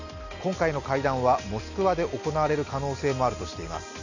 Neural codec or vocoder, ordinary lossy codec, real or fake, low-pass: none; none; real; 7.2 kHz